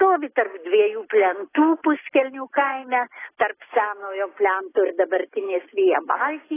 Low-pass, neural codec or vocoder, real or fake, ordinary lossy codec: 3.6 kHz; none; real; AAC, 16 kbps